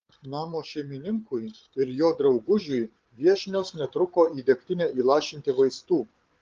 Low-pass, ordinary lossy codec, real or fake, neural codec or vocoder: 7.2 kHz; Opus, 16 kbps; fake; codec, 16 kHz, 8 kbps, FreqCodec, larger model